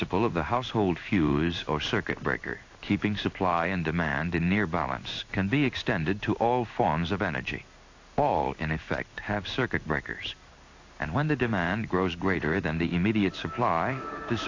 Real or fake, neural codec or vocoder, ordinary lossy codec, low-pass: fake; codec, 16 kHz in and 24 kHz out, 1 kbps, XY-Tokenizer; AAC, 48 kbps; 7.2 kHz